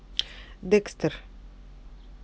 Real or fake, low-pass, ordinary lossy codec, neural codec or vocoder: real; none; none; none